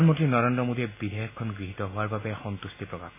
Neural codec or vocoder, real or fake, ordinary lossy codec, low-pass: none; real; MP3, 16 kbps; 3.6 kHz